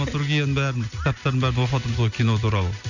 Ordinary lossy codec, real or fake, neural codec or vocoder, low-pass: none; real; none; 7.2 kHz